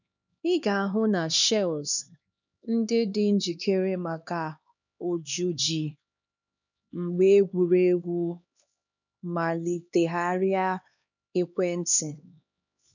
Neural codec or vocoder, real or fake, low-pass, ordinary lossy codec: codec, 16 kHz, 2 kbps, X-Codec, HuBERT features, trained on LibriSpeech; fake; 7.2 kHz; none